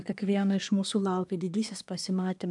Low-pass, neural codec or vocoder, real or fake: 10.8 kHz; codec, 24 kHz, 1 kbps, SNAC; fake